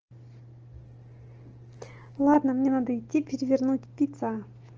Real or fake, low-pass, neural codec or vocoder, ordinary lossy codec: real; 7.2 kHz; none; Opus, 16 kbps